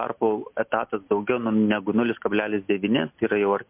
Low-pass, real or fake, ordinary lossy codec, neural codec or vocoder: 3.6 kHz; real; MP3, 32 kbps; none